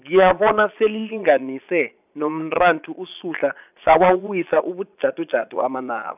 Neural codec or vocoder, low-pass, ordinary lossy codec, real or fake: vocoder, 22.05 kHz, 80 mel bands, Vocos; 3.6 kHz; none; fake